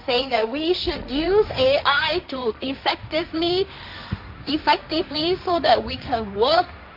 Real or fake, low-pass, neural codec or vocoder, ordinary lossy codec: fake; 5.4 kHz; codec, 16 kHz, 1.1 kbps, Voila-Tokenizer; none